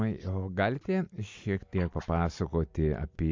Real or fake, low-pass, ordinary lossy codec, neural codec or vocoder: real; 7.2 kHz; MP3, 64 kbps; none